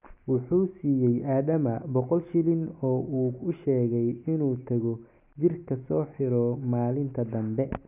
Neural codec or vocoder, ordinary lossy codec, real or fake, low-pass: none; none; real; 3.6 kHz